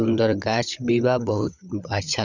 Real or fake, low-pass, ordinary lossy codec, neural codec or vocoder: fake; 7.2 kHz; none; codec, 16 kHz, 16 kbps, FunCodec, trained on LibriTTS, 50 frames a second